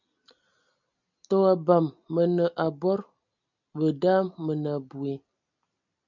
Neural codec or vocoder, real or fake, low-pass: none; real; 7.2 kHz